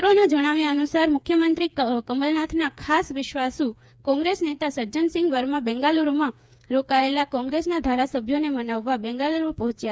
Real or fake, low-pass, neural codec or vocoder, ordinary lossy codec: fake; none; codec, 16 kHz, 4 kbps, FreqCodec, smaller model; none